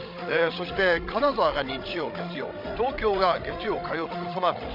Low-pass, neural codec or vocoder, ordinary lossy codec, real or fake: 5.4 kHz; codec, 16 kHz, 16 kbps, FreqCodec, larger model; MP3, 48 kbps; fake